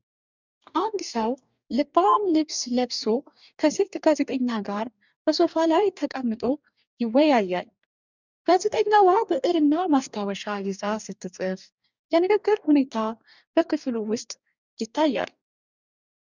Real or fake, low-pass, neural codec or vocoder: fake; 7.2 kHz; codec, 44.1 kHz, 2.6 kbps, DAC